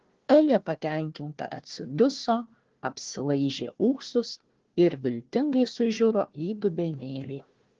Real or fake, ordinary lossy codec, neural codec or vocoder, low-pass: fake; Opus, 16 kbps; codec, 16 kHz, 1 kbps, FunCodec, trained on Chinese and English, 50 frames a second; 7.2 kHz